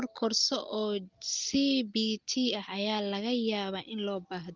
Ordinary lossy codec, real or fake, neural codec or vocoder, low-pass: Opus, 32 kbps; real; none; 7.2 kHz